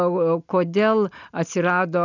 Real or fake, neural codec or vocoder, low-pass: real; none; 7.2 kHz